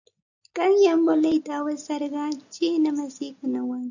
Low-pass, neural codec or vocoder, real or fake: 7.2 kHz; none; real